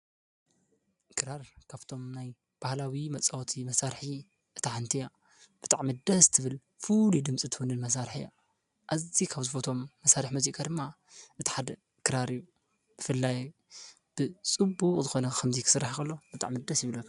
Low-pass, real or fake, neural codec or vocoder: 10.8 kHz; real; none